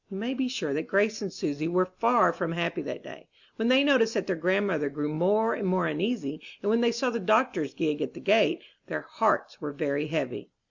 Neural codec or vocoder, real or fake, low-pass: none; real; 7.2 kHz